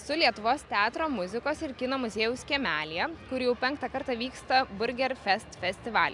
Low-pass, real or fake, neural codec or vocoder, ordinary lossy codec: 10.8 kHz; real; none; MP3, 96 kbps